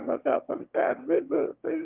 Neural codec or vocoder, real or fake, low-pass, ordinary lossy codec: autoencoder, 22.05 kHz, a latent of 192 numbers a frame, VITS, trained on one speaker; fake; 3.6 kHz; Opus, 32 kbps